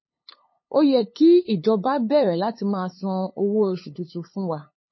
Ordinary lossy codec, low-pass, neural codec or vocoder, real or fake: MP3, 24 kbps; 7.2 kHz; codec, 16 kHz, 8 kbps, FunCodec, trained on LibriTTS, 25 frames a second; fake